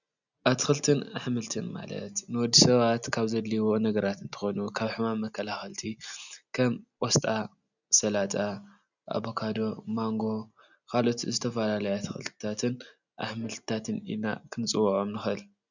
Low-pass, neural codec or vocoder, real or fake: 7.2 kHz; none; real